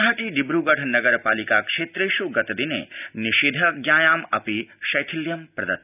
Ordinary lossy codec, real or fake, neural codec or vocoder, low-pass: none; real; none; 3.6 kHz